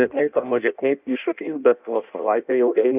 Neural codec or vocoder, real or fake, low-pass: codec, 16 kHz in and 24 kHz out, 0.6 kbps, FireRedTTS-2 codec; fake; 3.6 kHz